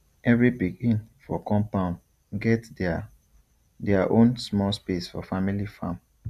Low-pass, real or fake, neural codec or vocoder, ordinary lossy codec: 14.4 kHz; fake; vocoder, 44.1 kHz, 128 mel bands every 256 samples, BigVGAN v2; none